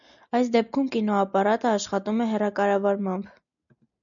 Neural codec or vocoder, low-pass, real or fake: none; 7.2 kHz; real